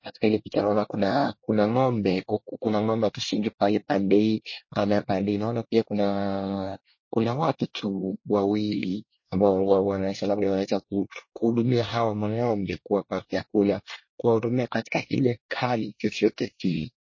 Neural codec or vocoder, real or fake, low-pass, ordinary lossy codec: codec, 24 kHz, 1 kbps, SNAC; fake; 7.2 kHz; MP3, 32 kbps